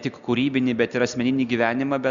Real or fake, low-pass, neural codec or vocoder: real; 7.2 kHz; none